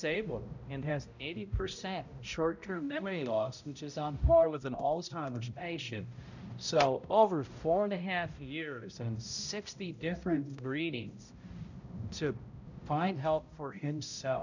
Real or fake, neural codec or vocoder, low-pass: fake; codec, 16 kHz, 0.5 kbps, X-Codec, HuBERT features, trained on balanced general audio; 7.2 kHz